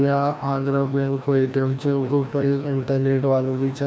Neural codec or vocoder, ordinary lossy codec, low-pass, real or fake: codec, 16 kHz, 1 kbps, FreqCodec, larger model; none; none; fake